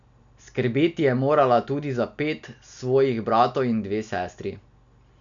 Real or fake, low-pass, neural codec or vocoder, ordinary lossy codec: real; 7.2 kHz; none; none